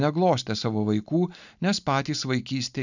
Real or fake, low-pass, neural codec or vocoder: real; 7.2 kHz; none